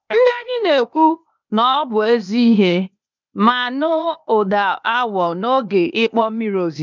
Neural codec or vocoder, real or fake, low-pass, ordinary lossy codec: codec, 16 kHz, 0.8 kbps, ZipCodec; fake; 7.2 kHz; none